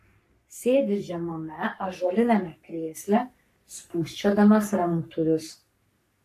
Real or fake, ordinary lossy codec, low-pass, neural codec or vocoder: fake; AAC, 64 kbps; 14.4 kHz; codec, 44.1 kHz, 3.4 kbps, Pupu-Codec